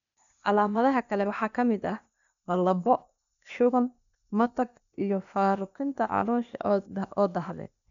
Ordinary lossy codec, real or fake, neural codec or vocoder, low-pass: none; fake; codec, 16 kHz, 0.8 kbps, ZipCodec; 7.2 kHz